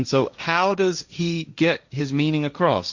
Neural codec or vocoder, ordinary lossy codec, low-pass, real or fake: codec, 16 kHz, 1.1 kbps, Voila-Tokenizer; Opus, 64 kbps; 7.2 kHz; fake